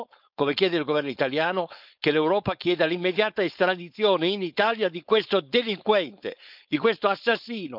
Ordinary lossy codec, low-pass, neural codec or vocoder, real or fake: none; 5.4 kHz; codec, 16 kHz, 4.8 kbps, FACodec; fake